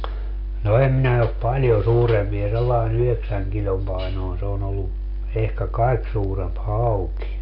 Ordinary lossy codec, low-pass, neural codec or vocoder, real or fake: none; 5.4 kHz; none; real